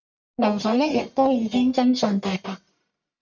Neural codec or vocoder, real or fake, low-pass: codec, 44.1 kHz, 1.7 kbps, Pupu-Codec; fake; 7.2 kHz